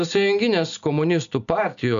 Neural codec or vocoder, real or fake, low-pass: none; real; 7.2 kHz